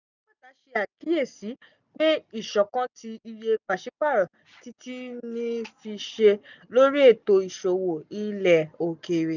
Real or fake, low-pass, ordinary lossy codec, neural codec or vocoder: real; 7.2 kHz; none; none